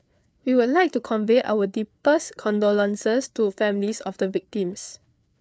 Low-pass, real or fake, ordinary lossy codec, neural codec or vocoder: none; fake; none; codec, 16 kHz, 4 kbps, FreqCodec, larger model